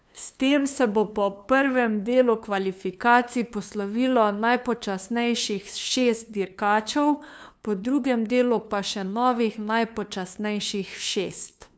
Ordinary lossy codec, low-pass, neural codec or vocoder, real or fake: none; none; codec, 16 kHz, 2 kbps, FunCodec, trained on LibriTTS, 25 frames a second; fake